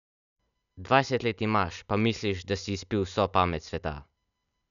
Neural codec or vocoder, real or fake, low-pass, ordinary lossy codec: none; real; 7.2 kHz; none